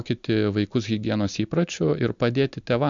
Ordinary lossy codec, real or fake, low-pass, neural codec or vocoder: MP3, 64 kbps; real; 7.2 kHz; none